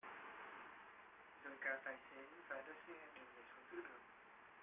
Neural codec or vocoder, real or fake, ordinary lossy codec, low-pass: none; real; AAC, 32 kbps; 3.6 kHz